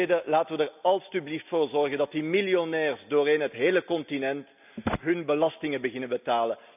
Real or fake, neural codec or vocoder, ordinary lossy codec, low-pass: real; none; none; 3.6 kHz